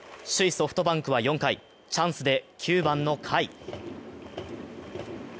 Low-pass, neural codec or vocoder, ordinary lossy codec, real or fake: none; none; none; real